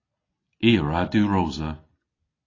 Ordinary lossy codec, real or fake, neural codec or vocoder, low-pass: AAC, 32 kbps; real; none; 7.2 kHz